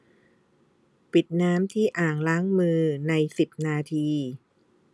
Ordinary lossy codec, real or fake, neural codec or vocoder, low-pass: none; real; none; none